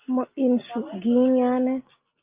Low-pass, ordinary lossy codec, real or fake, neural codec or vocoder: 3.6 kHz; Opus, 24 kbps; real; none